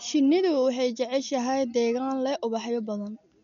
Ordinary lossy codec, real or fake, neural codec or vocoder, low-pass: none; real; none; 7.2 kHz